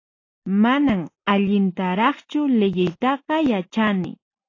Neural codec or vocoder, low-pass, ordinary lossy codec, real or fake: none; 7.2 kHz; AAC, 32 kbps; real